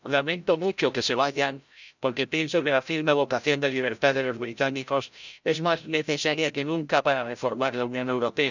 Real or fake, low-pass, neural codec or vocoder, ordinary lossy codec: fake; 7.2 kHz; codec, 16 kHz, 0.5 kbps, FreqCodec, larger model; none